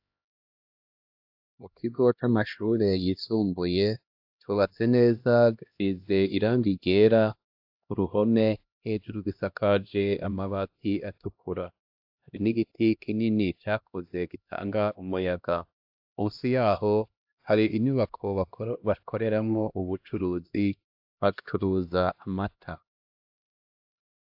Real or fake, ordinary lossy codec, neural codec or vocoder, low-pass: fake; AAC, 48 kbps; codec, 16 kHz, 1 kbps, X-Codec, HuBERT features, trained on LibriSpeech; 5.4 kHz